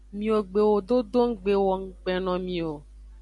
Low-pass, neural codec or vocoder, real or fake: 10.8 kHz; none; real